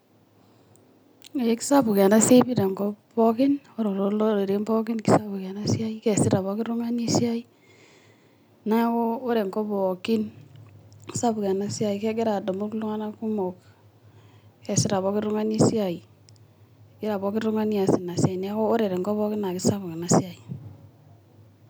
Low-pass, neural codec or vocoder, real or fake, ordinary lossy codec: none; none; real; none